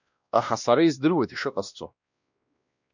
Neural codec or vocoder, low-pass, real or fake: codec, 16 kHz, 1 kbps, X-Codec, WavLM features, trained on Multilingual LibriSpeech; 7.2 kHz; fake